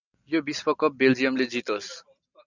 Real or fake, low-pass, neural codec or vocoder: real; 7.2 kHz; none